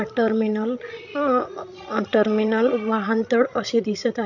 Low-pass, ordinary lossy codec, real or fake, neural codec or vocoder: 7.2 kHz; none; fake; codec, 16 kHz, 16 kbps, FreqCodec, larger model